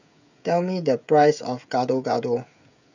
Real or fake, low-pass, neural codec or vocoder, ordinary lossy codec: fake; 7.2 kHz; codec, 16 kHz, 16 kbps, FreqCodec, smaller model; none